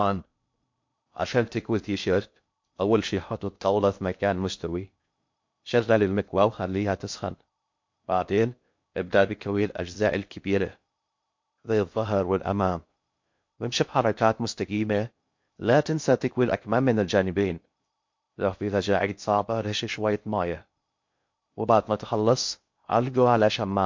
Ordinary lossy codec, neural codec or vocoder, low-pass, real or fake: MP3, 48 kbps; codec, 16 kHz in and 24 kHz out, 0.6 kbps, FocalCodec, streaming, 2048 codes; 7.2 kHz; fake